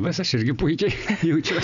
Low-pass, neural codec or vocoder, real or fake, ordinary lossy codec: 7.2 kHz; none; real; MP3, 96 kbps